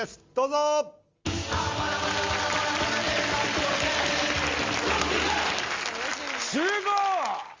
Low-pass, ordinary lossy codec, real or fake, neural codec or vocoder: 7.2 kHz; Opus, 32 kbps; real; none